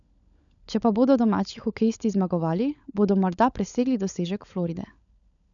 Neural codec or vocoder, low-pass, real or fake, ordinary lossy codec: codec, 16 kHz, 16 kbps, FunCodec, trained on LibriTTS, 50 frames a second; 7.2 kHz; fake; none